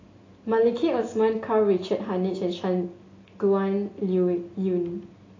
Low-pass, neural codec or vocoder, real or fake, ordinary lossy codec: 7.2 kHz; none; real; AAC, 32 kbps